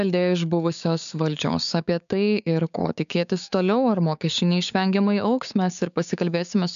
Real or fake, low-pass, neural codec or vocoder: fake; 7.2 kHz; codec, 16 kHz, 6 kbps, DAC